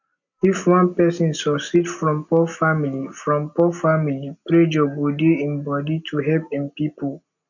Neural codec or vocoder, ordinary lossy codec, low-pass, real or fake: none; none; 7.2 kHz; real